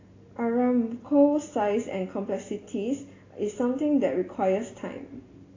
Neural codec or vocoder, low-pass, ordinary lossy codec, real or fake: none; 7.2 kHz; AAC, 32 kbps; real